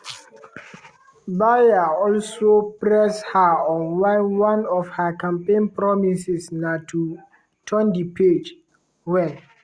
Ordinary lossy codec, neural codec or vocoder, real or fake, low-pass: Opus, 64 kbps; none; real; 9.9 kHz